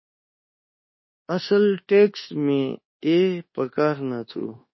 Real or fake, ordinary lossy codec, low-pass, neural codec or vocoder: fake; MP3, 24 kbps; 7.2 kHz; codec, 24 kHz, 1.2 kbps, DualCodec